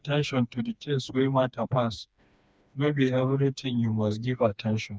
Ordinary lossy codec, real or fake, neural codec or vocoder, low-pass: none; fake; codec, 16 kHz, 2 kbps, FreqCodec, smaller model; none